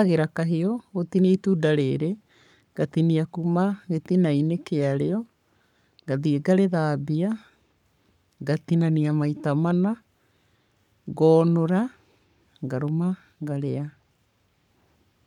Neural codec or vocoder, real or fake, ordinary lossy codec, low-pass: codec, 44.1 kHz, 7.8 kbps, Pupu-Codec; fake; none; 19.8 kHz